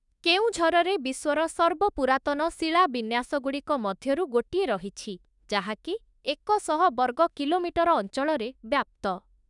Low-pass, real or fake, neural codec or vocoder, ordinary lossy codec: 10.8 kHz; fake; autoencoder, 48 kHz, 32 numbers a frame, DAC-VAE, trained on Japanese speech; none